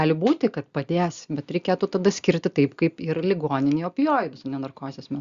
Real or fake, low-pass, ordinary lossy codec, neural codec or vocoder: real; 7.2 kHz; Opus, 64 kbps; none